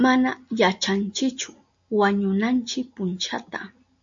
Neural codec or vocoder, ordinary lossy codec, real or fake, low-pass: none; AAC, 64 kbps; real; 7.2 kHz